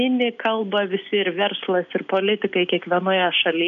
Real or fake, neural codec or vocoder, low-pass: real; none; 7.2 kHz